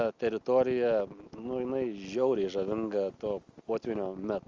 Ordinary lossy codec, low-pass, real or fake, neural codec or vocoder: Opus, 32 kbps; 7.2 kHz; real; none